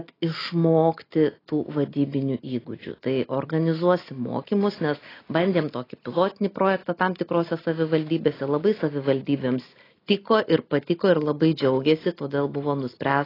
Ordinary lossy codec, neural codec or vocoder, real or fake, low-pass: AAC, 24 kbps; none; real; 5.4 kHz